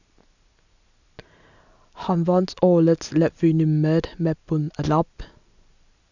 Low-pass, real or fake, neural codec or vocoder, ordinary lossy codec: 7.2 kHz; real; none; none